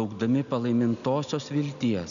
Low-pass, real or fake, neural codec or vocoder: 7.2 kHz; real; none